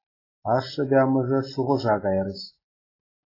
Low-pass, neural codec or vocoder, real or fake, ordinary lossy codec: 5.4 kHz; none; real; AAC, 24 kbps